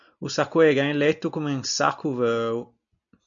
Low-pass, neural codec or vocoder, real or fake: 7.2 kHz; none; real